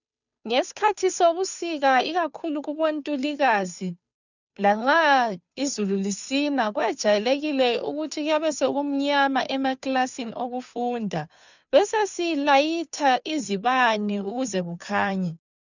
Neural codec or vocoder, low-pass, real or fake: codec, 16 kHz, 2 kbps, FunCodec, trained on Chinese and English, 25 frames a second; 7.2 kHz; fake